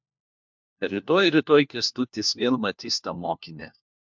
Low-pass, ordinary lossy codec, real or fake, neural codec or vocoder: 7.2 kHz; MP3, 64 kbps; fake; codec, 16 kHz, 1 kbps, FunCodec, trained on LibriTTS, 50 frames a second